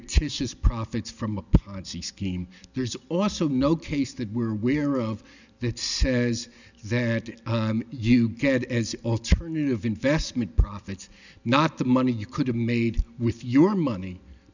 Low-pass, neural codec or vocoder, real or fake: 7.2 kHz; none; real